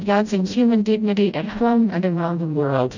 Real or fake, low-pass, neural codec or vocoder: fake; 7.2 kHz; codec, 16 kHz, 0.5 kbps, FreqCodec, smaller model